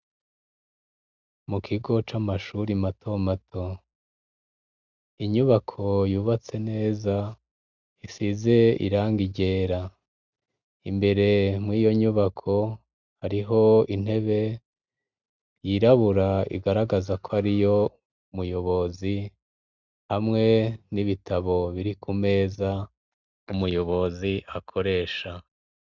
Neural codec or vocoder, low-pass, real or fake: none; 7.2 kHz; real